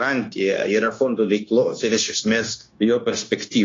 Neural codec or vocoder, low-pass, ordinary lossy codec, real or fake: codec, 16 kHz, 0.9 kbps, LongCat-Audio-Codec; 7.2 kHz; AAC, 32 kbps; fake